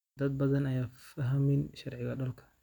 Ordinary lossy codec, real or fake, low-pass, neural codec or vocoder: none; real; 19.8 kHz; none